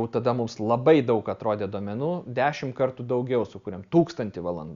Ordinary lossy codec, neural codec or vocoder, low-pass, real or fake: Opus, 64 kbps; none; 7.2 kHz; real